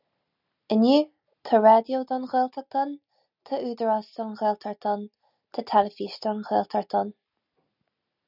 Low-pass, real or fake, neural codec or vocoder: 5.4 kHz; real; none